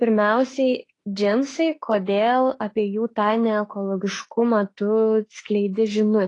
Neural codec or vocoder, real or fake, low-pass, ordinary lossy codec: autoencoder, 48 kHz, 32 numbers a frame, DAC-VAE, trained on Japanese speech; fake; 10.8 kHz; AAC, 32 kbps